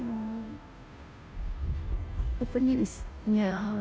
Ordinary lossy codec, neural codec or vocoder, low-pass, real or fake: none; codec, 16 kHz, 0.5 kbps, FunCodec, trained on Chinese and English, 25 frames a second; none; fake